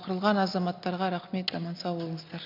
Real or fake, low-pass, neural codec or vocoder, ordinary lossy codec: real; 5.4 kHz; none; MP3, 48 kbps